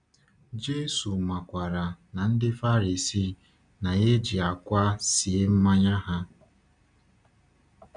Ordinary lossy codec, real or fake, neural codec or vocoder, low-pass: none; real; none; 9.9 kHz